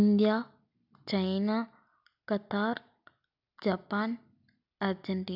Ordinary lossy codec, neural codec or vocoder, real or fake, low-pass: none; none; real; 5.4 kHz